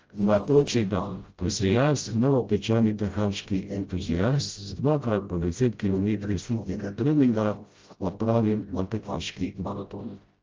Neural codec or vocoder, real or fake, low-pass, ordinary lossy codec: codec, 16 kHz, 0.5 kbps, FreqCodec, smaller model; fake; 7.2 kHz; Opus, 24 kbps